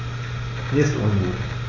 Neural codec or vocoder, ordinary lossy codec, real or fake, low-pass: none; AAC, 32 kbps; real; 7.2 kHz